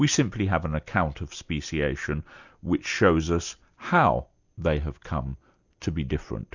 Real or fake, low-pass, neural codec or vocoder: real; 7.2 kHz; none